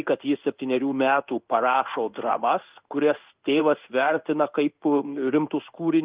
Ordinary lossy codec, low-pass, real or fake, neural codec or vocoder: Opus, 32 kbps; 3.6 kHz; fake; codec, 16 kHz in and 24 kHz out, 1 kbps, XY-Tokenizer